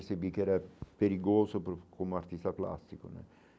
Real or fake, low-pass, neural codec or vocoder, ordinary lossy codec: real; none; none; none